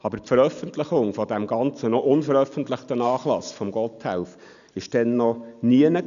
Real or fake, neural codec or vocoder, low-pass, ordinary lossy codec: real; none; 7.2 kHz; none